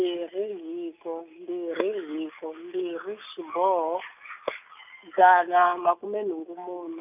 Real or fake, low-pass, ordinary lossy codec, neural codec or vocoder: real; 3.6 kHz; none; none